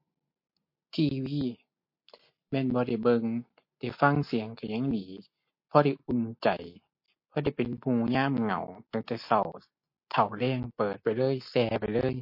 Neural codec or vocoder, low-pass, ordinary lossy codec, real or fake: none; 5.4 kHz; MP3, 32 kbps; real